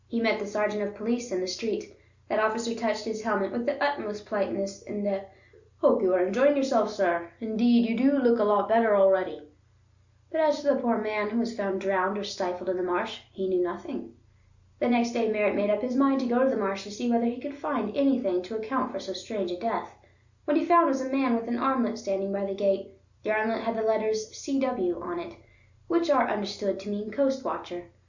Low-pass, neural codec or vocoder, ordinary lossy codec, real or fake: 7.2 kHz; none; Opus, 64 kbps; real